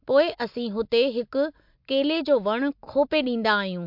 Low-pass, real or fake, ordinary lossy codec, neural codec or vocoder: 5.4 kHz; real; MP3, 48 kbps; none